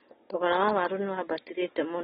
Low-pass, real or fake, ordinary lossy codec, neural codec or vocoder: 19.8 kHz; real; AAC, 16 kbps; none